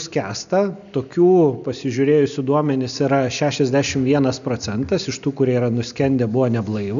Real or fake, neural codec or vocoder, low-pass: real; none; 7.2 kHz